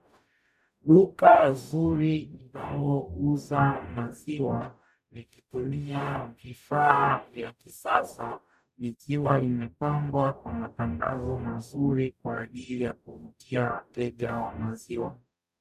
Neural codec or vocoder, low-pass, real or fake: codec, 44.1 kHz, 0.9 kbps, DAC; 14.4 kHz; fake